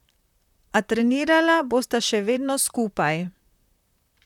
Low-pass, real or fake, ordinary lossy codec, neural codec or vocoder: 19.8 kHz; fake; none; vocoder, 44.1 kHz, 128 mel bands, Pupu-Vocoder